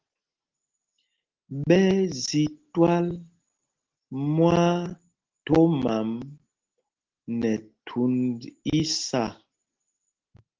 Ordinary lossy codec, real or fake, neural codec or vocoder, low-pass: Opus, 16 kbps; real; none; 7.2 kHz